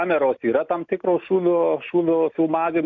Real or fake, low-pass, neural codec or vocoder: real; 7.2 kHz; none